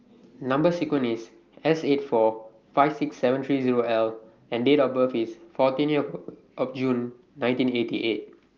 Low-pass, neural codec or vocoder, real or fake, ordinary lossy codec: 7.2 kHz; none; real; Opus, 32 kbps